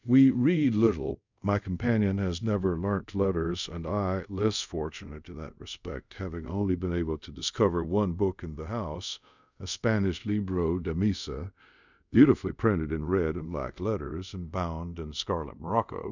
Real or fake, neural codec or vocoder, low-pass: fake; codec, 24 kHz, 0.5 kbps, DualCodec; 7.2 kHz